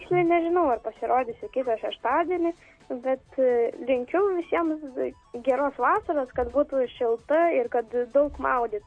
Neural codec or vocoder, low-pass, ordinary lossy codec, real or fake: none; 9.9 kHz; MP3, 48 kbps; real